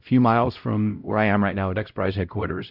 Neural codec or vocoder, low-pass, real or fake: codec, 16 kHz, 0.5 kbps, X-Codec, WavLM features, trained on Multilingual LibriSpeech; 5.4 kHz; fake